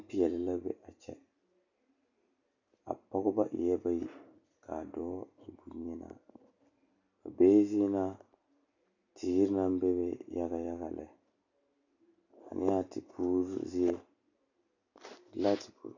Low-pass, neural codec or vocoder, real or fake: 7.2 kHz; none; real